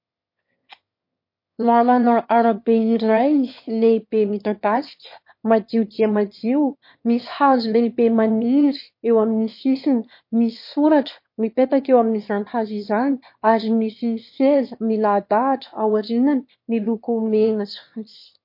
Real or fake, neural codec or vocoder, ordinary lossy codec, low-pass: fake; autoencoder, 22.05 kHz, a latent of 192 numbers a frame, VITS, trained on one speaker; MP3, 32 kbps; 5.4 kHz